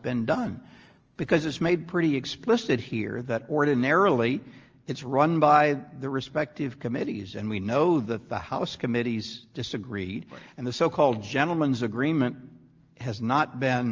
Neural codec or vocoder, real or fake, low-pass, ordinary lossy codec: none; real; 7.2 kHz; Opus, 24 kbps